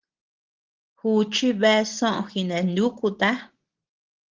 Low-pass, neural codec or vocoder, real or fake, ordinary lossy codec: 7.2 kHz; none; real; Opus, 16 kbps